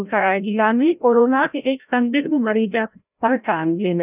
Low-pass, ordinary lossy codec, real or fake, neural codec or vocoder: 3.6 kHz; none; fake; codec, 16 kHz, 0.5 kbps, FreqCodec, larger model